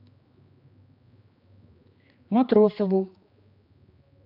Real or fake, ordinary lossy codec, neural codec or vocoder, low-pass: fake; AAC, 48 kbps; codec, 16 kHz, 2 kbps, X-Codec, HuBERT features, trained on general audio; 5.4 kHz